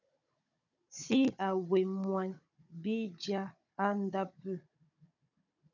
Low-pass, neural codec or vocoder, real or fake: 7.2 kHz; codec, 16 kHz, 16 kbps, FunCodec, trained on Chinese and English, 50 frames a second; fake